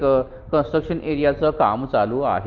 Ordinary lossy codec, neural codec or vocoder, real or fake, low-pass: Opus, 32 kbps; none; real; 7.2 kHz